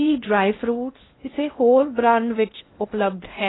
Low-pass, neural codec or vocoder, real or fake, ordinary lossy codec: 7.2 kHz; codec, 16 kHz in and 24 kHz out, 0.6 kbps, FocalCodec, streaming, 2048 codes; fake; AAC, 16 kbps